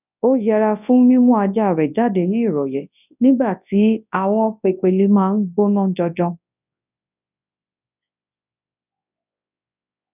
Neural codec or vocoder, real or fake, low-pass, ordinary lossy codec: codec, 24 kHz, 0.9 kbps, WavTokenizer, large speech release; fake; 3.6 kHz; none